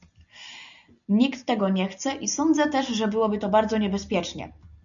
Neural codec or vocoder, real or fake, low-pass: none; real; 7.2 kHz